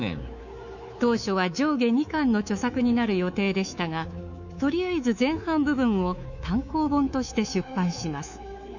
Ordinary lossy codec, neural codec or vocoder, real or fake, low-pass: none; codec, 24 kHz, 3.1 kbps, DualCodec; fake; 7.2 kHz